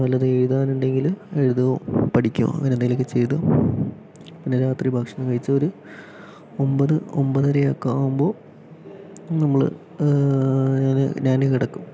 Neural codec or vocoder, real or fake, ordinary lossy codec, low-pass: none; real; none; none